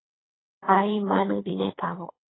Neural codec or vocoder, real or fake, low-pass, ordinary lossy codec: codec, 24 kHz, 3 kbps, HILCodec; fake; 7.2 kHz; AAC, 16 kbps